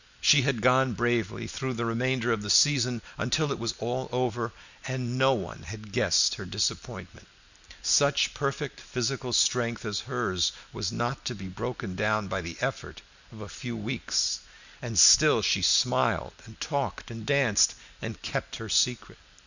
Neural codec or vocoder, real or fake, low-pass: none; real; 7.2 kHz